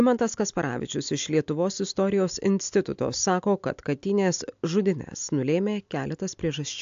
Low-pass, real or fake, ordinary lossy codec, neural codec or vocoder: 7.2 kHz; real; AAC, 64 kbps; none